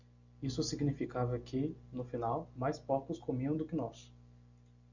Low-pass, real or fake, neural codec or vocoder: 7.2 kHz; real; none